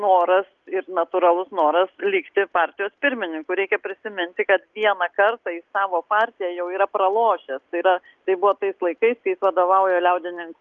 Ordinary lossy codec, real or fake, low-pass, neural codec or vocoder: Opus, 32 kbps; real; 7.2 kHz; none